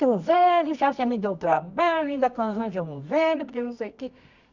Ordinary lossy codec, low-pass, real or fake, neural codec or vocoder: Opus, 64 kbps; 7.2 kHz; fake; codec, 24 kHz, 0.9 kbps, WavTokenizer, medium music audio release